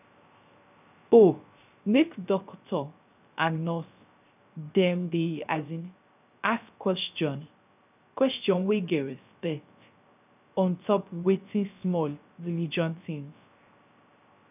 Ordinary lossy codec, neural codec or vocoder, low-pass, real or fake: none; codec, 16 kHz, 0.3 kbps, FocalCodec; 3.6 kHz; fake